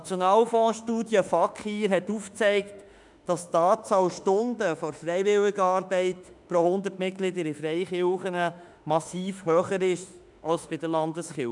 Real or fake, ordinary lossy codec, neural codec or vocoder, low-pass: fake; none; autoencoder, 48 kHz, 32 numbers a frame, DAC-VAE, trained on Japanese speech; 10.8 kHz